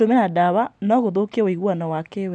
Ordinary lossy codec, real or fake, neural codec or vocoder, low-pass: none; real; none; none